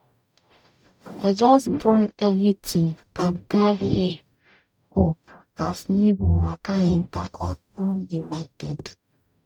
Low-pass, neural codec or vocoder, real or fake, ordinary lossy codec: 19.8 kHz; codec, 44.1 kHz, 0.9 kbps, DAC; fake; none